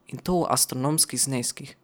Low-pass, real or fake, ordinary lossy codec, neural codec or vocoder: none; real; none; none